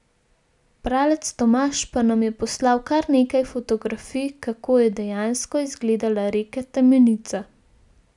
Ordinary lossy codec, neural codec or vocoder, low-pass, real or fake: none; none; 10.8 kHz; real